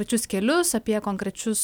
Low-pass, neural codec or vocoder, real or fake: 19.8 kHz; none; real